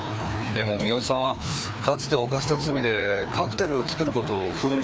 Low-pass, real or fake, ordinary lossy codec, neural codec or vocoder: none; fake; none; codec, 16 kHz, 2 kbps, FreqCodec, larger model